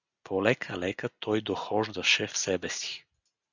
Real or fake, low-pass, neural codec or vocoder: real; 7.2 kHz; none